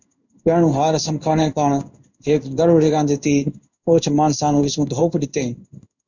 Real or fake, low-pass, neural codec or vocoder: fake; 7.2 kHz; codec, 16 kHz in and 24 kHz out, 1 kbps, XY-Tokenizer